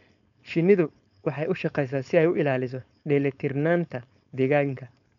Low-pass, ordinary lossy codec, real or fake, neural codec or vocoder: 7.2 kHz; none; fake; codec, 16 kHz, 4.8 kbps, FACodec